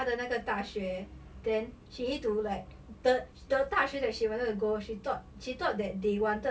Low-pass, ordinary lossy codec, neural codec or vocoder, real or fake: none; none; none; real